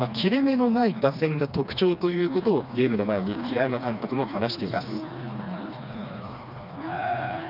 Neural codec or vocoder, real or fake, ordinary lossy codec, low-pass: codec, 16 kHz, 2 kbps, FreqCodec, smaller model; fake; none; 5.4 kHz